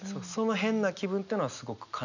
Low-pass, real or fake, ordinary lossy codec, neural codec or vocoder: 7.2 kHz; real; none; none